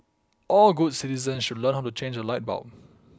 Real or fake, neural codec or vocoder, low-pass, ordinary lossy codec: real; none; none; none